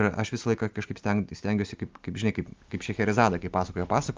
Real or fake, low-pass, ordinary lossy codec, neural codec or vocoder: real; 7.2 kHz; Opus, 32 kbps; none